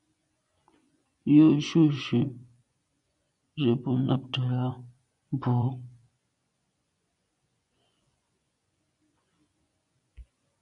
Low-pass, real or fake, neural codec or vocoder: 10.8 kHz; fake; vocoder, 24 kHz, 100 mel bands, Vocos